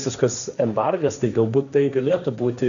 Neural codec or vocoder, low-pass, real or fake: codec, 16 kHz, 1.1 kbps, Voila-Tokenizer; 7.2 kHz; fake